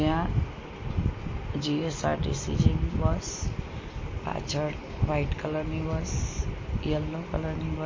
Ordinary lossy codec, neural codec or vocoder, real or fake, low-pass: MP3, 32 kbps; none; real; 7.2 kHz